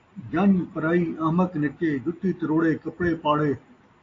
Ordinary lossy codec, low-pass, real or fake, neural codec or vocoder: AAC, 32 kbps; 7.2 kHz; real; none